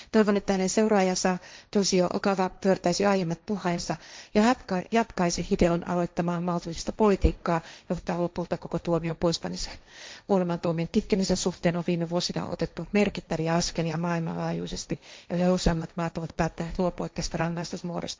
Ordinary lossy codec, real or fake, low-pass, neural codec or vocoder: none; fake; none; codec, 16 kHz, 1.1 kbps, Voila-Tokenizer